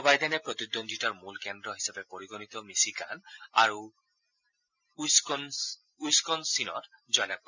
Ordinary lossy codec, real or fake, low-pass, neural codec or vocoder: none; real; 7.2 kHz; none